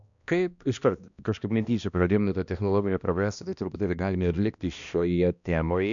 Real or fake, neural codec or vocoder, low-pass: fake; codec, 16 kHz, 1 kbps, X-Codec, HuBERT features, trained on balanced general audio; 7.2 kHz